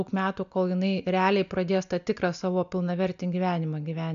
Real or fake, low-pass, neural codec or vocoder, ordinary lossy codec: real; 7.2 kHz; none; AAC, 96 kbps